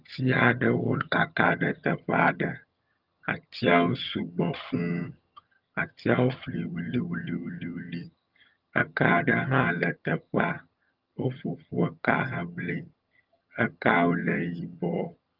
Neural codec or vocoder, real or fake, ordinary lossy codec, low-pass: vocoder, 22.05 kHz, 80 mel bands, HiFi-GAN; fake; Opus, 32 kbps; 5.4 kHz